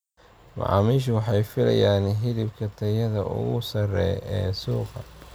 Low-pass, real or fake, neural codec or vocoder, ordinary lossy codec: none; real; none; none